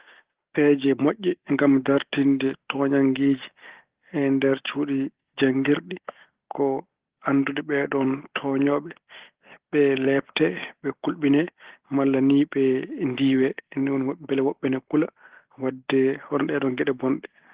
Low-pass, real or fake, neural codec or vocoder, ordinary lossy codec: 3.6 kHz; real; none; Opus, 16 kbps